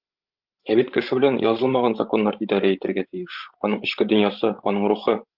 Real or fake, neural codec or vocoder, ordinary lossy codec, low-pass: fake; codec, 16 kHz, 16 kbps, FreqCodec, larger model; Opus, 24 kbps; 5.4 kHz